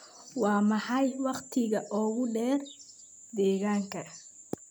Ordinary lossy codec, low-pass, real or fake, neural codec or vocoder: none; none; real; none